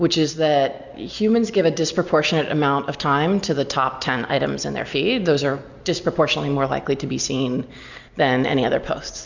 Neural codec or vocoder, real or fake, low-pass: none; real; 7.2 kHz